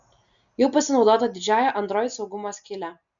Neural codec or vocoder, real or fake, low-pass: none; real; 7.2 kHz